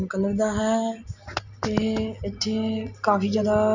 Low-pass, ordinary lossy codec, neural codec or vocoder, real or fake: 7.2 kHz; none; none; real